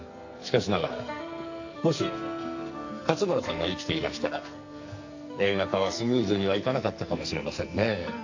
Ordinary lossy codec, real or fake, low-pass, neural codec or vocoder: AAC, 48 kbps; fake; 7.2 kHz; codec, 44.1 kHz, 2.6 kbps, SNAC